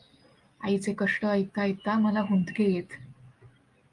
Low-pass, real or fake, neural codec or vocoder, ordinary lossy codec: 10.8 kHz; real; none; Opus, 32 kbps